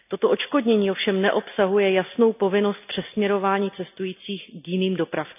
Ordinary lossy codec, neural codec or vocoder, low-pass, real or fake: none; none; 3.6 kHz; real